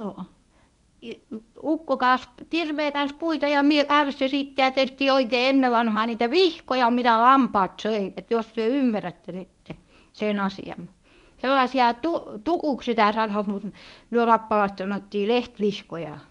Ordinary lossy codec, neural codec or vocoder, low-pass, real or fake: none; codec, 24 kHz, 0.9 kbps, WavTokenizer, medium speech release version 1; 10.8 kHz; fake